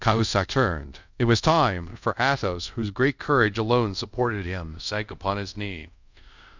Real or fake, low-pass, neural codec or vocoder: fake; 7.2 kHz; codec, 24 kHz, 0.5 kbps, DualCodec